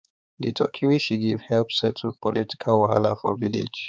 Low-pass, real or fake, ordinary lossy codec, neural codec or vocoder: none; fake; none; codec, 16 kHz, 4 kbps, X-Codec, HuBERT features, trained on balanced general audio